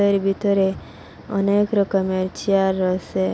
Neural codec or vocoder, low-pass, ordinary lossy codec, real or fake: none; none; none; real